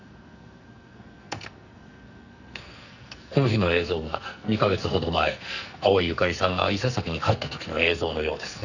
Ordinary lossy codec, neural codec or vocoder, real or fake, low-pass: none; codec, 44.1 kHz, 2.6 kbps, SNAC; fake; 7.2 kHz